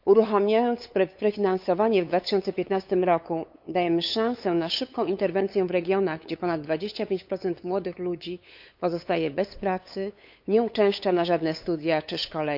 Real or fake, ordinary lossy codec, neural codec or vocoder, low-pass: fake; none; codec, 16 kHz, 8 kbps, FunCodec, trained on LibriTTS, 25 frames a second; 5.4 kHz